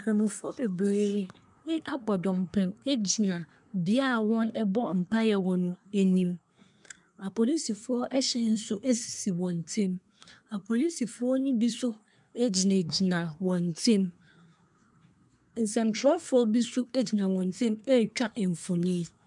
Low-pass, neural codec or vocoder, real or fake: 10.8 kHz; codec, 24 kHz, 1 kbps, SNAC; fake